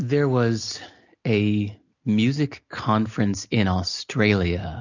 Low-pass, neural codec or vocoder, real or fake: 7.2 kHz; none; real